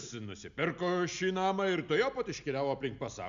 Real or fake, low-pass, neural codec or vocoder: real; 7.2 kHz; none